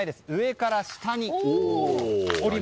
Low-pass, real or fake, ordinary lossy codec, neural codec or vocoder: none; real; none; none